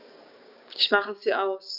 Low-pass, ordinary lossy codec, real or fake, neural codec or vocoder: 5.4 kHz; none; fake; codec, 16 kHz, 6 kbps, DAC